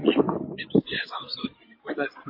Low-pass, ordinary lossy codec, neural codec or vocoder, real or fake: 5.4 kHz; MP3, 32 kbps; codec, 16 kHz in and 24 kHz out, 2.2 kbps, FireRedTTS-2 codec; fake